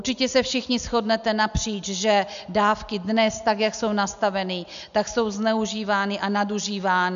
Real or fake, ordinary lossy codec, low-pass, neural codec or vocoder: real; MP3, 96 kbps; 7.2 kHz; none